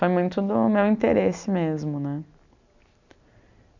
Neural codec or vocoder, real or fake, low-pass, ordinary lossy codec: none; real; 7.2 kHz; none